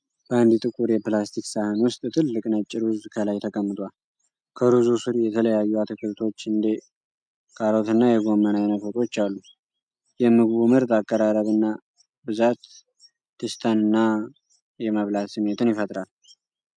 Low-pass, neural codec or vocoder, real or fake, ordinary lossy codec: 9.9 kHz; none; real; MP3, 96 kbps